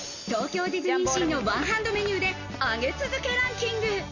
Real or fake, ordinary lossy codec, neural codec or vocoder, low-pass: real; none; none; 7.2 kHz